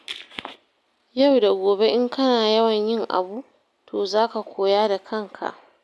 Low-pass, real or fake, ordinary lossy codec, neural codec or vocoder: none; real; none; none